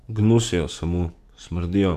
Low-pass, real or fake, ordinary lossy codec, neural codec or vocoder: 14.4 kHz; fake; none; codec, 44.1 kHz, 7.8 kbps, DAC